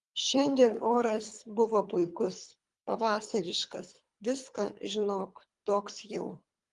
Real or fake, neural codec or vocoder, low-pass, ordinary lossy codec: fake; codec, 24 kHz, 3 kbps, HILCodec; 10.8 kHz; Opus, 32 kbps